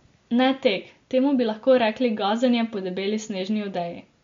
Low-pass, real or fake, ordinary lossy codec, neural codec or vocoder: 7.2 kHz; real; MP3, 48 kbps; none